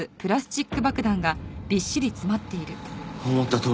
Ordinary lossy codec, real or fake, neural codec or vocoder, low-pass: none; real; none; none